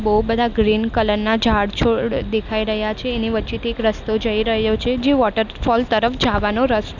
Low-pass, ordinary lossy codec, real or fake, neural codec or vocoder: 7.2 kHz; none; real; none